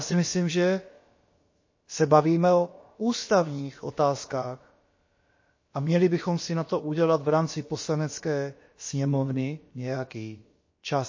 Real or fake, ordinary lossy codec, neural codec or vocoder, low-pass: fake; MP3, 32 kbps; codec, 16 kHz, about 1 kbps, DyCAST, with the encoder's durations; 7.2 kHz